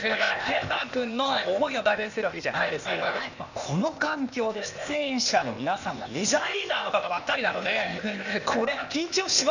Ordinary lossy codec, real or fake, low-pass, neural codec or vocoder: none; fake; 7.2 kHz; codec, 16 kHz, 0.8 kbps, ZipCodec